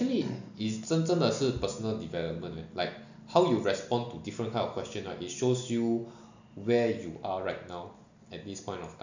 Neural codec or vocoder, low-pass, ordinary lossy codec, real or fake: none; 7.2 kHz; none; real